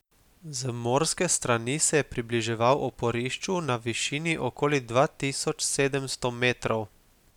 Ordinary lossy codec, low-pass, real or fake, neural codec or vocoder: none; 19.8 kHz; real; none